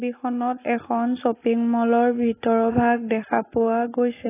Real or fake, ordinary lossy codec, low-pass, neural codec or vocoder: real; AAC, 16 kbps; 3.6 kHz; none